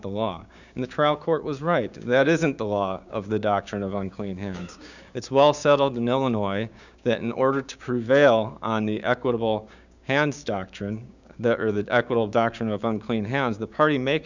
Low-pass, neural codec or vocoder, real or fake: 7.2 kHz; codec, 16 kHz, 6 kbps, DAC; fake